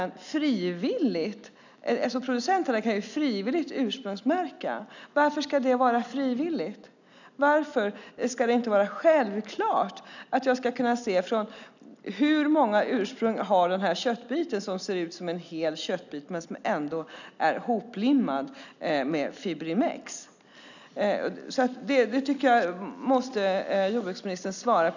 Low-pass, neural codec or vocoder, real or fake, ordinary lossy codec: 7.2 kHz; none; real; none